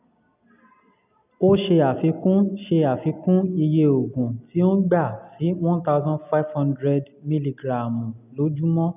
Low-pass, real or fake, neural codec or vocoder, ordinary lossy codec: 3.6 kHz; real; none; none